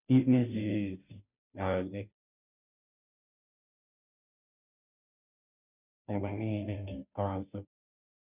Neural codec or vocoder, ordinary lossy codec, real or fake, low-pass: codec, 16 kHz, 0.5 kbps, FunCodec, trained on Chinese and English, 25 frames a second; none; fake; 3.6 kHz